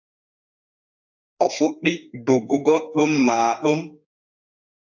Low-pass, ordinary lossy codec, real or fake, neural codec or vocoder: 7.2 kHz; AAC, 48 kbps; fake; codec, 32 kHz, 1.9 kbps, SNAC